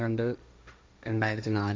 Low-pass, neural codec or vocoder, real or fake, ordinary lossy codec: none; codec, 16 kHz, 1.1 kbps, Voila-Tokenizer; fake; none